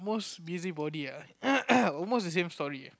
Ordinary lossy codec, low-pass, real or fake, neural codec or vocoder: none; none; real; none